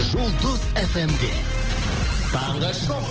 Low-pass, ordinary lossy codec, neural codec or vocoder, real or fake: 7.2 kHz; Opus, 16 kbps; none; real